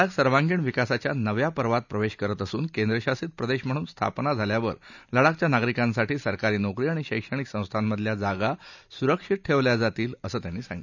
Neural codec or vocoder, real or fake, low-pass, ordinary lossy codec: none; real; 7.2 kHz; none